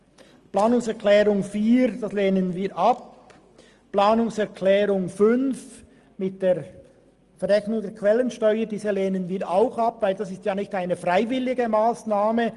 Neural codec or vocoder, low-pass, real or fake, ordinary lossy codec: none; 10.8 kHz; real; Opus, 32 kbps